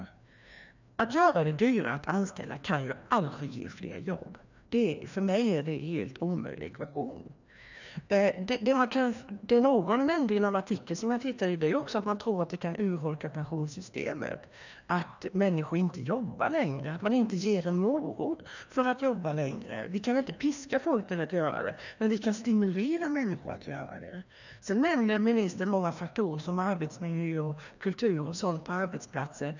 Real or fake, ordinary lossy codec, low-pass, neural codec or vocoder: fake; none; 7.2 kHz; codec, 16 kHz, 1 kbps, FreqCodec, larger model